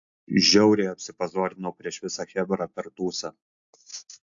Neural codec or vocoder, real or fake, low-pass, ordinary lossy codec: none; real; 7.2 kHz; AAC, 64 kbps